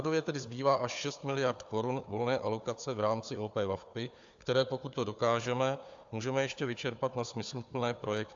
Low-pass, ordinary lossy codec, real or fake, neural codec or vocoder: 7.2 kHz; AAC, 64 kbps; fake; codec, 16 kHz, 4 kbps, FunCodec, trained on Chinese and English, 50 frames a second